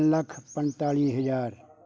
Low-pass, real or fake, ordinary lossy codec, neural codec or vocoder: none; real; none; none